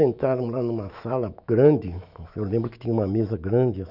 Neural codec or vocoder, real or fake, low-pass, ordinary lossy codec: autoencoder, 48 kHz, 128 numbers a frame, DAC-VAE, trained on Japanese speech; fake; 5.4 kHz; none